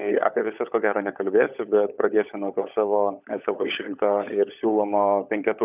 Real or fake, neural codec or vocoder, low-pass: fake; codec, 16 kHz, 16 kbps, FunCodec, trained on LibriTTS, 50 frames a second; 3.6 kHz